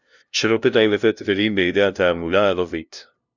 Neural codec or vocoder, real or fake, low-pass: codec, 16 kHz, 0.5 kbps, FunCodec, trained on LibriTTS, 25 frames a second; fake; 7.2 kHz